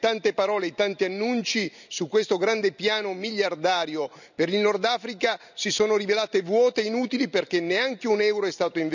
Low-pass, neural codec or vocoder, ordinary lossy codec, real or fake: 7.2 kHz; none; none; real